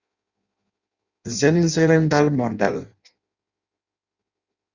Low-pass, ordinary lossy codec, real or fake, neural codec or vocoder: 7.2 kHz; Opus, 64 kbps; fake; codec, 16 kHz in and 24 kHz out, 0.6 kbps, FireRedTTS-2 codec